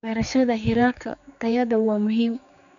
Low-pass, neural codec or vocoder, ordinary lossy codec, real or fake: 7.2 kHz; codec, 16 kHz, 4 kbps, X-Codec, HuBERT features, trained on general audio; none; fake